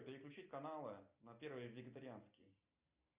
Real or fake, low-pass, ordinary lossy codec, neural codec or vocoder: real; 3.6 kHz; Opus, 64 kbps; none